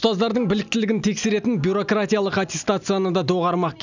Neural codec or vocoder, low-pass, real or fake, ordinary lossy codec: none; 7.2 kHz; real; none